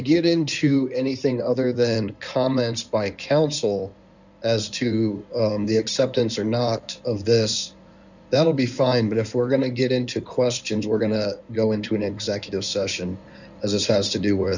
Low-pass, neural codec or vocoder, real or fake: 7.2 kHz; codec, 16 kHz in and 24 kHz out, 2.2 kbps, FireRedTTS-2 codec; fake